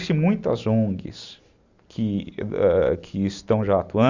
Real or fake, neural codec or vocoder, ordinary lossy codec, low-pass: real; none; Opus, 64 kbps; 7.2 kHz